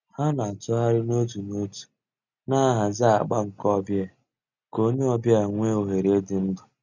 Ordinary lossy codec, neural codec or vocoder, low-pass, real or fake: none; none; 7.2 kHz; real